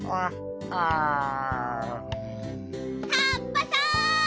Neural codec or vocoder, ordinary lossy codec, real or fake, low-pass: none; none; real; none